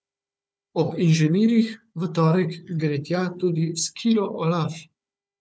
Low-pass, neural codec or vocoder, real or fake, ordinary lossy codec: none; codec, 16 kHz, 4 kbps, FunCodec, trained on Chinese and English, 50 frames a second; fake; none